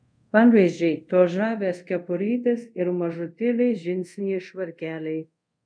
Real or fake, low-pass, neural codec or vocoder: fake; 9.9 kHz; codec, 24 kHz, 0.5 kbps, DualCodec